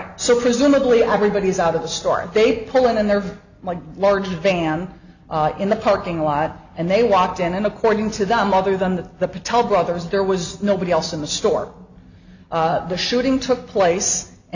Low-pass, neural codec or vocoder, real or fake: 7.2 kHz; none; real